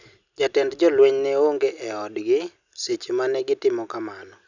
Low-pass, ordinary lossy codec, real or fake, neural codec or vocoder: 7.2 kHz; none; real; none